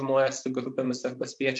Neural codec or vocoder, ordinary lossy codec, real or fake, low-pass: vocoder, 44.1 kHz, 128 mel bands, Pupu-Vocoder; MP3, 96 kbps; fake; 10.8 kHz